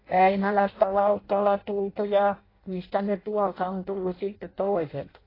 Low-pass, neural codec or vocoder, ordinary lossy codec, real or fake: 5.4 kHz; codec, 16 kHz in and 24 kHz out, 0.6 kbps, FireRedTTS-2 codec; AAC, 24 kbps; fake